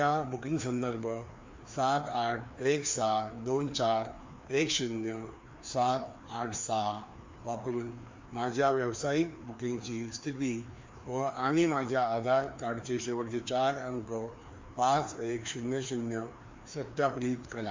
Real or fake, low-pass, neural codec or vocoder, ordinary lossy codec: fake; 7.2 kHz; codec, 16 kHz, 2 kbps, FreqCodec, larger model; MP3, 48 kbps